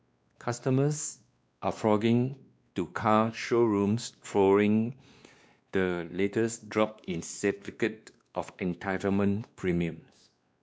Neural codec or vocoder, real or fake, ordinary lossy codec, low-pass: codec, 16 kHz, 2 kbps, X-Codec, WavLM features, trained on Multilingual LibriSpeech; fake; none; none